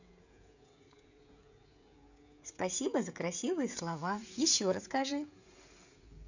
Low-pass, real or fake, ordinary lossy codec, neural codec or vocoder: 7.2 kHz; fake; none; codec, 16 kHz, 16 kbps, FreqCodec, smaller model